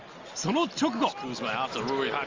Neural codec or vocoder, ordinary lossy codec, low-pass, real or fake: none; Opus, 32 kbps; 7.2 kHz; real